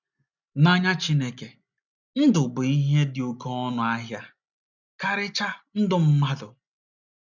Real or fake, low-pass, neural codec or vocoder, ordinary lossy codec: real; 7.2 kHz; none; none